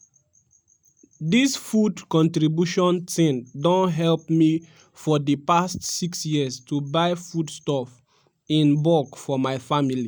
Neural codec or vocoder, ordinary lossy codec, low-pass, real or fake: none; none; none; real